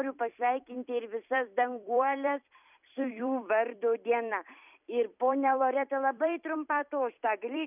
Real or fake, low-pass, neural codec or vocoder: fake; 3.6 kHz; vocoder, 44.1 kHz, 128 mel bands every 256 samples, BigVGAN v2